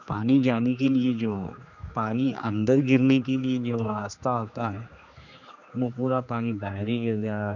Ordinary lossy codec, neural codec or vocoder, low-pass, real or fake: none; codec, 16 kHz, 2 kbps, X-Codec, HuBERT features, trained on general audio; 7.2 kHz; fake